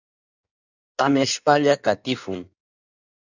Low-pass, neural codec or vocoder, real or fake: 7.2 kHz; codec, 16 kHz in and 24 kHz out, 1.1 kbps, FireRedTTS-2 codec; fake